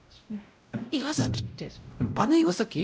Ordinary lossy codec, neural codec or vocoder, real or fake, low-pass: none; codec, 16 kHz, 0.5 kbps, X-Codec, WavLM features, trained on Multilingual LibriSpeech; fake; none